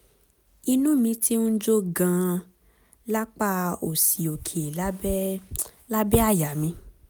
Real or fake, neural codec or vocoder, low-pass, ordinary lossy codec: real; none; none; none